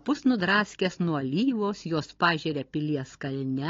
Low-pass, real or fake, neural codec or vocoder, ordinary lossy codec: 7.2 kHz; fake; codec, 16 kHz, 16 kbps, FunCodec, trained on Chinese and English, 50 frames a second; AAC, 32 kbps